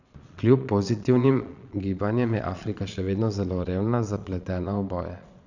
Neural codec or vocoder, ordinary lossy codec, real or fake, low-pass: vocoder, 22.05 kHz, 80 mel bands, Vocos; none; fake; 7.2 kHz